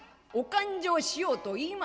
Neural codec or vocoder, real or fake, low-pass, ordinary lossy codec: none; real; none; none